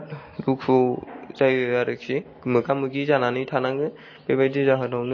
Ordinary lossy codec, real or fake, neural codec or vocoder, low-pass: MP3, 32 kbps; real; none; 7.2 kHz